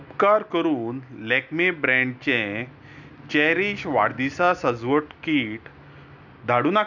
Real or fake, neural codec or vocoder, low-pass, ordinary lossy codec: real; none; 7.2 kHz; none